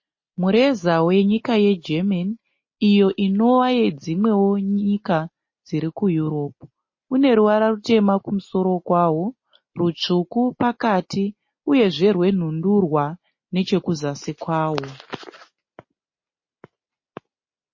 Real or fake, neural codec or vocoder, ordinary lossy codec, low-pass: real; none; MP3, 32 kbps; 7.2 kHz